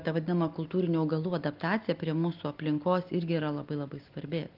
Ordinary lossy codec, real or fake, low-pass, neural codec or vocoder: Opus, 32 kbps; real; 5.4 kHz; none